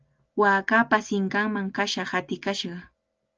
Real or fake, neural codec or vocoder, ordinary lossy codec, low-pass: real; none; Opus, 24 kbps; 7.2 kHz